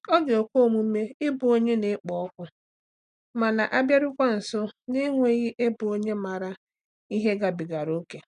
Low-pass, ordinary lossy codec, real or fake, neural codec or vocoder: 9.9 kHz; none; real; none